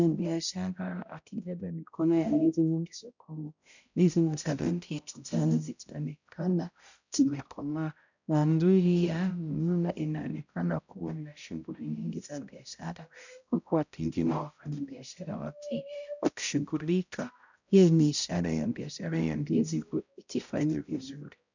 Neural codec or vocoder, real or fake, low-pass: codec, 16 kHz, 0.5 kbps, X-Codec, HuBERT features, trained on balanced general audio; fake; 7.2 kHz